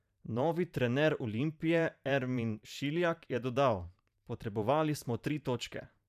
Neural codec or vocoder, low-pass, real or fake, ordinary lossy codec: vocoder, 44.1 kHz, 128 mel bands every 512 samples, BigVGAN v2; 14.4 kHz; fake; none